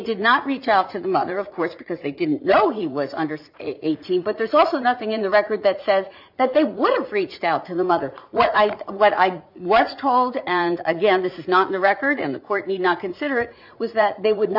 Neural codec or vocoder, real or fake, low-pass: vocoder, 44.1 kHz, 80 mel bands, Vocos; fake; 5.4 kHz